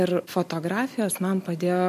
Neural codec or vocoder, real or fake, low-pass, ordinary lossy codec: none; real; 14.4 kHz; MP3, 64 kbps